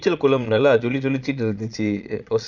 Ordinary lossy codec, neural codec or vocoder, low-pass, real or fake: none; vocoder, 22.05 kHz, 80 mel bands, Vocos; 7.2 kHz; fake